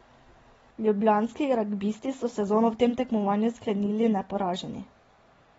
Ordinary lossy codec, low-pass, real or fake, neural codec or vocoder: AAC, 24 kbps; 19.8 kHz; real; none